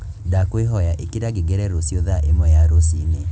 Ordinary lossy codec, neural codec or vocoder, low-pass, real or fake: none; none; none; real